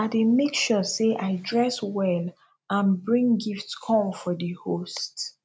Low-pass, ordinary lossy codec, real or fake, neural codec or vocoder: none; none; real; none